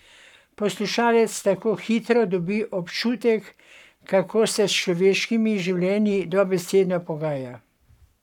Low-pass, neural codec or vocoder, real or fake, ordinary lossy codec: 19.8 kHz; codec, 44.1 kHz, 7.8 kbps, Pupu-Codec; fake; none